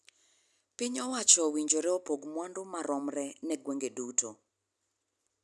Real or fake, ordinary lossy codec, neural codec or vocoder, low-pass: real; none; none; none